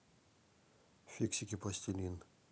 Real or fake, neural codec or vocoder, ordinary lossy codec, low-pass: real; none; none; none